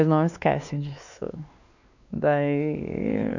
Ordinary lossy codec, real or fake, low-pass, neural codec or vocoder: none; fake; 7.2 kHz; codec, 16 kHz, 2 kbps, X-Codec, WavLM features, trained on Multilingual LibriSpeech